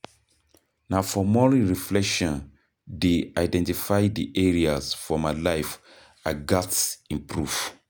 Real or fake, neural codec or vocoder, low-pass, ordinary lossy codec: real; none; none; none